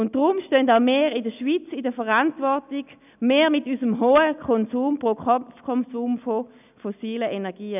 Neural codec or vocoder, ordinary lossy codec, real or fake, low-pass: none; none; real; 3.6 kHz